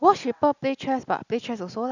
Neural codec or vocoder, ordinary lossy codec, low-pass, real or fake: none; none; 7.2 kHz; real